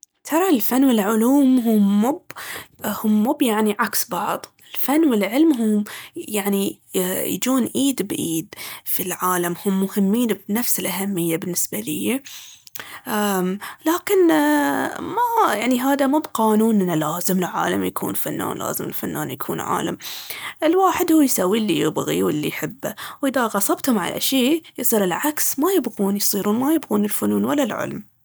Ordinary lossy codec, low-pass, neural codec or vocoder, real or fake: none; none; none; real